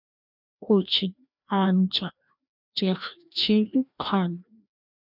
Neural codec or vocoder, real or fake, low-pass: codec, 16 kHz, 1 kbps, FreqCodec, larger model; fake; 5.4 kHz